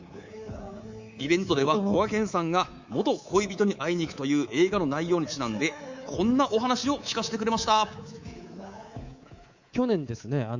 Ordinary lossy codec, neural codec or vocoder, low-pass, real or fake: Opus, 64 kbps; codec, 24 kHz, 3.1 kbps, DualCodec; 7.2 kHz; fake